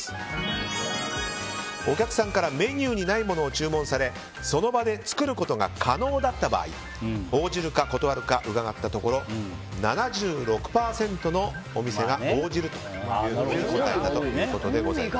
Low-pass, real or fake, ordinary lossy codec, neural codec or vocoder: none; real; none; none